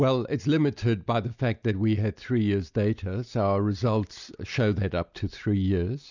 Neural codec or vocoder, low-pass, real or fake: none; 7.2 kHz; real